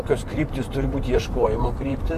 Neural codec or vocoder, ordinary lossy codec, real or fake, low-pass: vocoder, 44.1 kHz, 128 mel bands, Pupu-Vocoder; AAC, 64 kbps; fake; 14.4 kHz